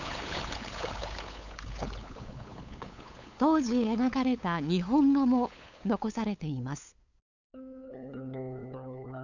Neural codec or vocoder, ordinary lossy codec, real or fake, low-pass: codec, 16 kHz, 8 kbps, FunCodec, trained on LibriTTS, 25 frames a second; none; fake; 7.2 kHz